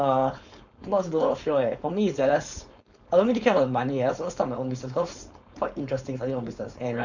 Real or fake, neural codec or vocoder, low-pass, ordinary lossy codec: fake; codec, 16 kHz, 4.8 kbps, FACodec; 7.2 kHz; none